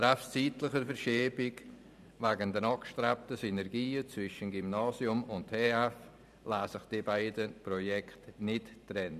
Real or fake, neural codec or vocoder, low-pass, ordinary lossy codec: fake; vocoder, 44.1 kHz, 128 mel bands every 512 samples, BigVGAN v2; 14.4 kHz; none